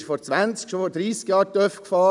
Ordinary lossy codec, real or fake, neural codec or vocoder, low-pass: none; real; none; 10.8 kHz